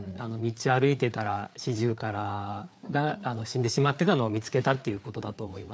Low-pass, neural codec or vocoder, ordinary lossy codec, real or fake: none; codec, 16 kHz, 4 kbps, FreqCodec, larger model; none; fake